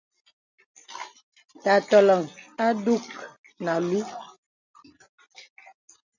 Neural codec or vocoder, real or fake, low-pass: none; real; 7.2 kHz